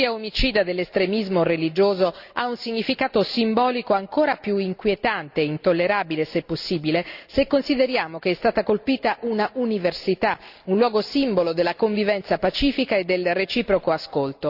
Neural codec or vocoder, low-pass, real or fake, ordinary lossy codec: none; 5.4 kHz; real; Opus, 64 kbps